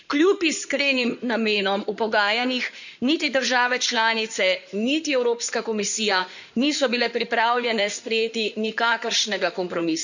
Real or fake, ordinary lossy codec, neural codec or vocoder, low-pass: fake; none; codec, 16 kHz in and 24 kHz out, 2.2 kbps, FireRedTTS-2 codec; 7.2 kHz